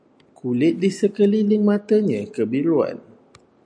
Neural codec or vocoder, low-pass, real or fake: none; 9.9 kHz; real